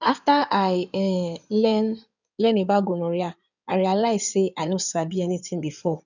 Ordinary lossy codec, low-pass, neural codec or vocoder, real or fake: none; 7.2 kHz; codec, 16 kHz in and 24 kHz out, 2.2 kbps, FireRedTTS-2 codec; fake